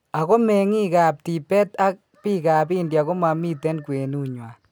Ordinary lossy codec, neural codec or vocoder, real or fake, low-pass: none; none; real; none